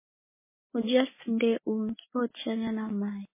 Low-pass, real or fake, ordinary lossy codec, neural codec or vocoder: 3.6 kHz; real; MP3, 16 kbps; none